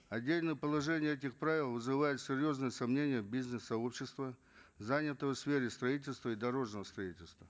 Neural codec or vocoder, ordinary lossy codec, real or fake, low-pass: none; none; real; none